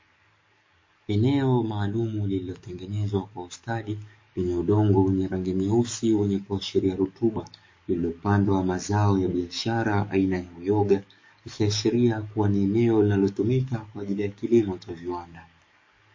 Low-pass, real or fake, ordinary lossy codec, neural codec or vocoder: 7.2 kHz; fake; MP3, 32 kbps; codec, 44.1 kHz, 7.8 kbps, DAC